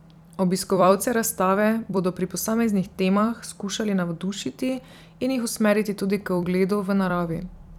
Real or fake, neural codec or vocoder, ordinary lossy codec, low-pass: fake; vocoder, 44.1 kHz, 128 mel bands every 256 samples, BigVGAN v2; none; 19.8 kHz